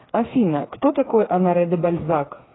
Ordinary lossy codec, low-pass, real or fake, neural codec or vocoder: AAC, 16 kbps; 7.2 kHz; fake; codec, 16 kHz, 4 kbps, FreqCodec, smaller model